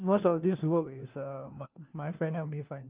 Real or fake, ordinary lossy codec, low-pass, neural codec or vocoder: fake; Opus, 24 kbps; 3.6 kHz; codec, 16 kHz, 1 kbps, FunCodec, trained on LibriTTS, 50 frames a second